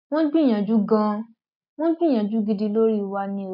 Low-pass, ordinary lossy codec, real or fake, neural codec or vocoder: 5.4 kHz; none; real; none